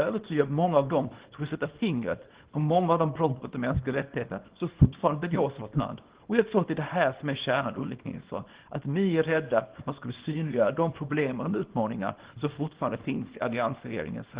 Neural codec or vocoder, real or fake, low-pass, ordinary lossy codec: codec, 24 kHz, 0.9 kbps, WavTokenizer, small release; fake; 3.6 kHz; Opus, 16 kbps